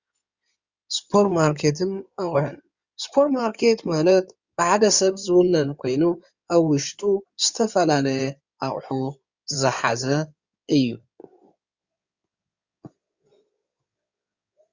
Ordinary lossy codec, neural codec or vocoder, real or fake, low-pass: Opus, 64 kbps; codec, 16 kHz in and 24 kHz out, 2.2 kbps, FireRedTTS-2 codec; fake; 7.2 kHz